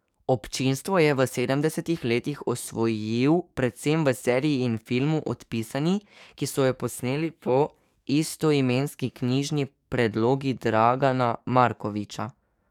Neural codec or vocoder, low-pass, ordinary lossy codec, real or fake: codec, 44.1 kHz, 7.8 kbps, DAC; 19.8 kHz; none; fake